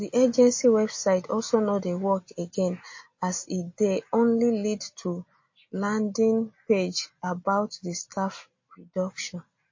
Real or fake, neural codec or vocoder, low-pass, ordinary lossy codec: real; none; 7.2 kHz; MP3, 32 kbps